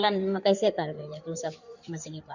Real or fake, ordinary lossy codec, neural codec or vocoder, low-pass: fake; none; codec, 16 kHz in and 24 kHz out, 2.2 kbps, FireRedTTS-2 codec; 7.2 kHz